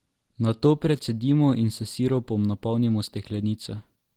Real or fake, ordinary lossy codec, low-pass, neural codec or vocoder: real; Opus, 16 kbps; 19.8 kHz; none